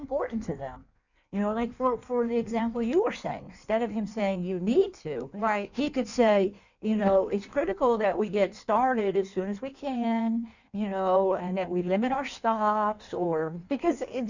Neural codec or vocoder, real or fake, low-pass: codec, 16 kHz in and 24 kHz out, 1.1 kbps, FireRedTTS-2 codec; fake; 7.2 kHz